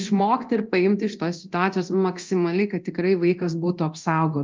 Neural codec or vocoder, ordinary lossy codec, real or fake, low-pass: codec, 24 kHz, 0.9 kbps, DualCodec; Opus, 32 kbps; fake; 7.2 kHz